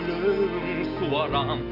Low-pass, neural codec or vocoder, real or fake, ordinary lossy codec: 5.4 kHz; none; real; none